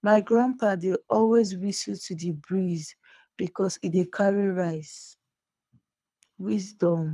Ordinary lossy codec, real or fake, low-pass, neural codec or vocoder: none; fake; none; codec, 24 kHz, 3 kbps, HILCodec